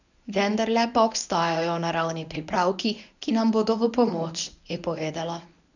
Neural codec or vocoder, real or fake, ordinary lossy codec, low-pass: codec, 24 kHz, 0.9 kbps, WavTokenizer, medium speech release version 1; fake; none; 7.2 kHz